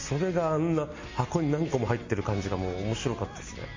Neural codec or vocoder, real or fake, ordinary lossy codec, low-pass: none; real; MP3, 32 kbps; 7.2 kHz